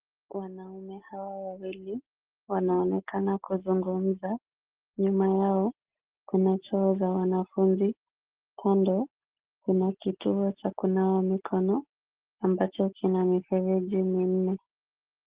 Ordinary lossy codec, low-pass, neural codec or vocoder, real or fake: Opus, 16 kbps; 3.6 kHz; none; real